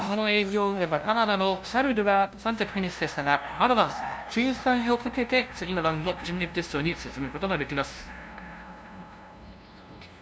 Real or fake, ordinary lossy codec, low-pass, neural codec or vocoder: fake; none; none; codec, 16 kHz, 0.5 kbps, FunCodec, trained on LibriTTS, 25 frames a second